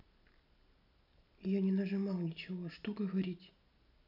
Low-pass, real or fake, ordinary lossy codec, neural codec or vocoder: 5.4 kHz; real; none; none